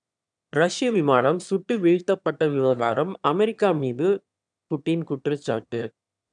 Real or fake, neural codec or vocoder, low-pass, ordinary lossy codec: fake; autoencoder, 22.05 kHz, a latent of 192 numbers a frame, VITS, trained on one speaker; 9.9 kHz; none